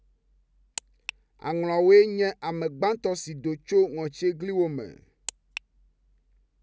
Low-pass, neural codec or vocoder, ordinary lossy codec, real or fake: none; none; none; real